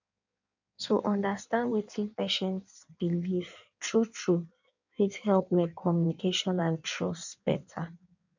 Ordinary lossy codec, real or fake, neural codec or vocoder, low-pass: none; fake; codec, 16 kHz in and 24 kHz out, 1.1 kbps, FireRedTTS-2 codec; 7.2 kHz